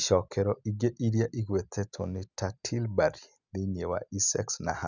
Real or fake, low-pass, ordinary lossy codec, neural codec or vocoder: real; 7.2 kHz; none; none